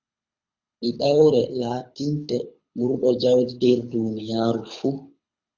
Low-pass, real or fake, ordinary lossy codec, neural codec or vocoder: 7.2 kHz; fake; Opus, 64 kbps; codec, 24 kHz, 6 kbps, HILCodec